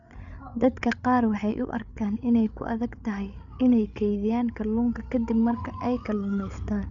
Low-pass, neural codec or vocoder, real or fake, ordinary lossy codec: 7.2 kHz; codec, 16 kHz, 8 kbps, FreqCodec, larger model; fake; none